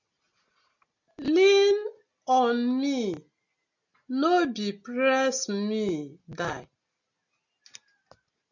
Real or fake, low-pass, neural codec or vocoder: real; 7.2 kHz; none